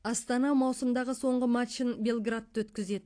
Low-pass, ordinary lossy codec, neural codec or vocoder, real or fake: 9.9 kHz; AAC, 48 kbps; none; real